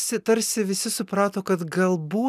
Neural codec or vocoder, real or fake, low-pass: none; real; 14.4 kHz